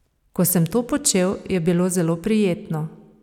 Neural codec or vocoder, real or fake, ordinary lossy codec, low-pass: none; real; none; 19.8 kHz